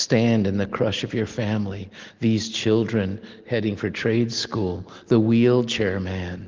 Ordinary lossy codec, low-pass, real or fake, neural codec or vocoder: Opus, 16 kbps; 7.2 kHz; real; none